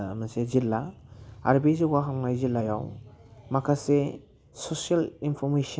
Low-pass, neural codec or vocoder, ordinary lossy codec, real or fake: none; none; none; real